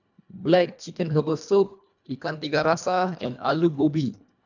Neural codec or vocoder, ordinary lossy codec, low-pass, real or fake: codec, 24 kHz, 1.5 kbps, HILCodec; none; 7.2 kHz; fake